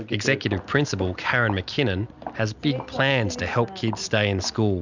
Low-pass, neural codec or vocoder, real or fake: 7.2 kHz; none; real